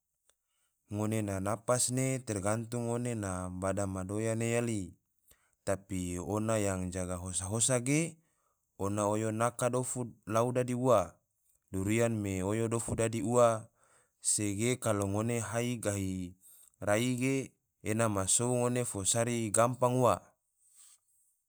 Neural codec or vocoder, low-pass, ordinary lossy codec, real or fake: none; none; none; real